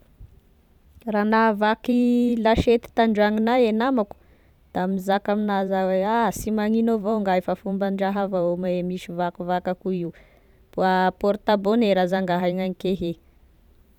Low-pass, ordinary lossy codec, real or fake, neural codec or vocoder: 19.8 kHz; none; fake; vocoder, 44.1 kHz, 128 mel bands every 512 samples, BigVGAN v2